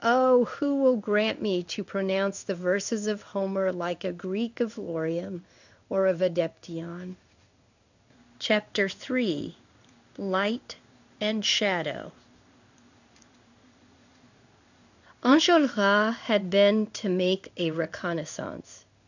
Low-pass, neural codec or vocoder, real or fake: 7.2 kHz; codec, 16 kHz in and 24 kHz out, 1 kbps, XY-Tokenizer; fake